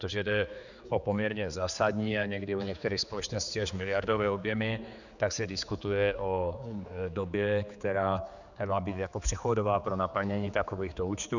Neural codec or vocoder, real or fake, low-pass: codec, 16 kHz, 4 kbps, X-Codec, HuBERT features, trained on general audio; fake; 7.2 kHz